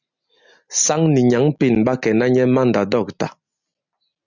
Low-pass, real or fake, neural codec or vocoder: 7.2 kHz; real; none